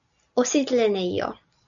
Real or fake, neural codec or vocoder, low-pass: real; none; 7.2 kHz